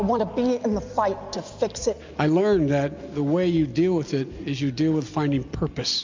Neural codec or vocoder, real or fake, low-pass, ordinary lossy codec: none; real; 7.2 kHz; MP3, 64 kbps